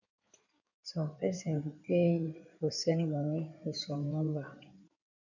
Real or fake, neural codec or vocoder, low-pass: fake; codec, 16 kHz in and 24 kHz out, 2.2 kbps, FireRedTTS-2 codec; 7.2 kHz